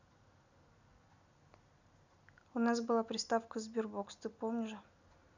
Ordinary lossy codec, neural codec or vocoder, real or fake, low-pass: none; none; real; 7.2 kHz